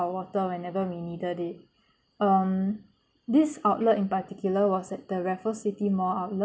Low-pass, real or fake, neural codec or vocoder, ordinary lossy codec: none; real; none; none